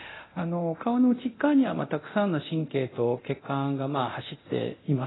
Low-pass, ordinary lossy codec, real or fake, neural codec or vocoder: 7.2 kHz; AAC, 16 kbps; fake; codec, 24 kHz, 0.9 kbps, DualCodec